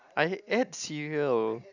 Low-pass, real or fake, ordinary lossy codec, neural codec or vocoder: 7.2 kHz; real; none; none